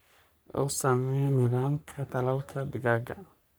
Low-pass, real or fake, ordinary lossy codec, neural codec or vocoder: none; fake; none; codec, 44.1 kHz, 3.4 kbps, Pupu-Codec